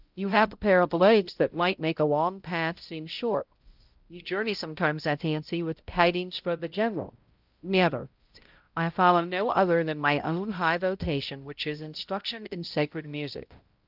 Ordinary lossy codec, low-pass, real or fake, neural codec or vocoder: Opus, 32 kbps; 5.4 kHz; fake; codec, 16 kHz, 0.5 kbps, X-Codec, HuBERT features, trained on balanced general audio